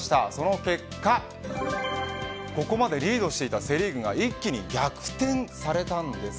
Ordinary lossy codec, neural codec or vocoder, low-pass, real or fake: none; none; none; real